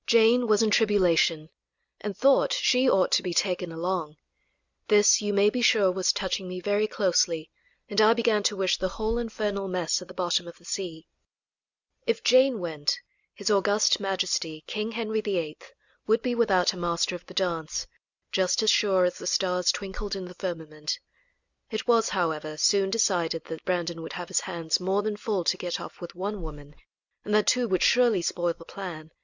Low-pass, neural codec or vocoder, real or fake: 7.2 kHz; none; real